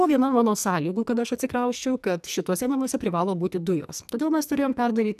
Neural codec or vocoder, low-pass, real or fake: codec, 44.1 kHz, 2.6 kbps, SNAC; 14.4 kHz; fake